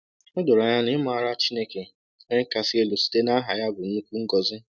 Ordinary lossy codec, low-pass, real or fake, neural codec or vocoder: none; none; real; none